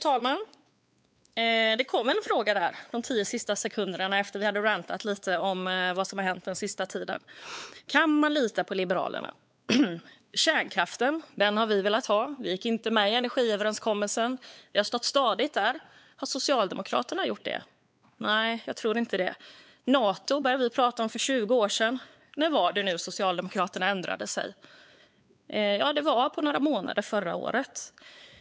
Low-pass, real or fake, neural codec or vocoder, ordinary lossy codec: none; fake; codec, 16 kHz, 4 kbps, X-Codec, WavLM features, trained on Multilingual LibriSpeech; none